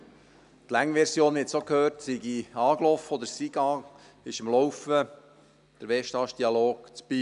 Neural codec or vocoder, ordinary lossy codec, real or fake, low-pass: none; none; real; 10.8 kHz